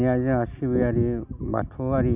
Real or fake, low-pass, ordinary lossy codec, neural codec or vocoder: real; 3.6 kHz; none; none